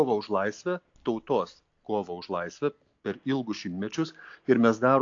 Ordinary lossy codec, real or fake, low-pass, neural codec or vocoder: AAC, 48 kbps; real; 7.2 kHz; none